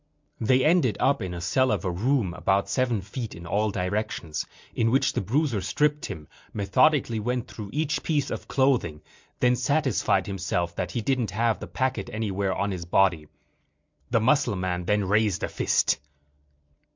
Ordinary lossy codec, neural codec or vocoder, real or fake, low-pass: MP3, 64 kbps; none; real; 7.2 kHz